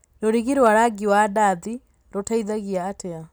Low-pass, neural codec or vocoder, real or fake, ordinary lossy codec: none; none; real; none